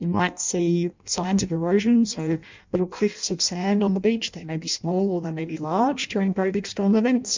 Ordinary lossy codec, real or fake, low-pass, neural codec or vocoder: MP3, 64 kbps; fake; 7.2 kHz; codec, 16 kHz in and 24 kHz out, 0.6 kbps, FireRedTTS-2 codec